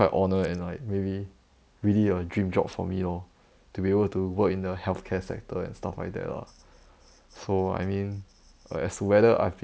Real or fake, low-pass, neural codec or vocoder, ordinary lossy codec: real; none; none; none